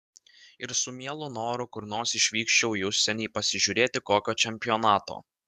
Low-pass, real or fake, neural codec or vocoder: 14.4 kHz; fake; codec, 44.1 kHz, 7.8 kbps, DAC